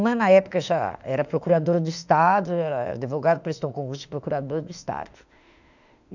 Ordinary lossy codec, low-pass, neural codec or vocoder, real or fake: none; 7.2 kHz; autoencoder, 48 kHz, 32 numbers a frame, DAC-VAE, trained on Japanese speech; fake